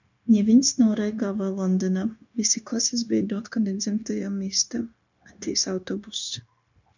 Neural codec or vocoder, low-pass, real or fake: codec, 16 kHz, 0.9 kbps, LongCat-Audio-Codec; 7.2 kHz; fake